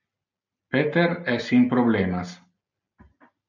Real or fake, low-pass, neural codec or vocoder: real; 7.2 kHz; none